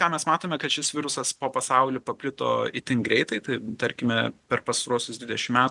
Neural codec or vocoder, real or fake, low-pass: none; real; 10.8 kHz